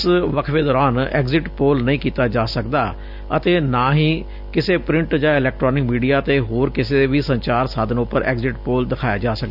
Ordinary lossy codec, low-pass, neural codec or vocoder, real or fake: none; 5.4 kHz; none; real